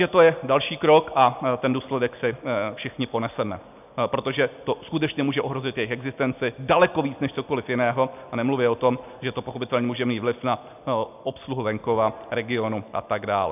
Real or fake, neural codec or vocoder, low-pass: real; none; 3.6 kHz